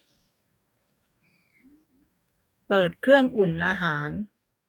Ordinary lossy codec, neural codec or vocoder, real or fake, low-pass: none; codec, 44.1 kHz, 2.6 kbps, DAC; fake; 19.8 kHz